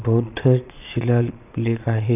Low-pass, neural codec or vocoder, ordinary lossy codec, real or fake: 3.6 kHz; vocoder, 22.05 kHz, 80 mel bands, Vocos; none; fake